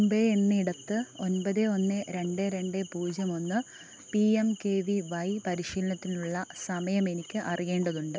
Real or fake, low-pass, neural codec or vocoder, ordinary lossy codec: real; none; none; none